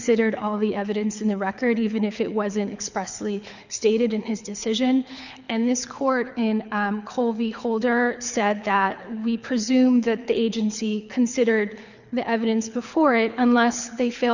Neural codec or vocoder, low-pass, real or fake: codec, 24 kHz, 6 kbps, HILCodec; 7.2 kHz; fake